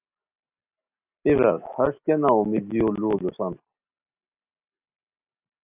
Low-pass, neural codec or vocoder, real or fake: 3.6 kHz; none; real